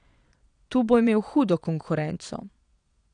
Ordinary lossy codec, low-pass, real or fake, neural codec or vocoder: none; 9.9 kHz; fake; vocoder, 22.05 kHz, 80 mel bands, Vocos